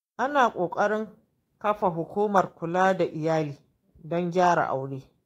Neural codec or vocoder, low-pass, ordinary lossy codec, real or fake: autoencoder, 48 kHz, 128 numbers a frame, DAC-VAE, trained on Japanese speech; 19.8 kHz; AAC, 48 kbps; fake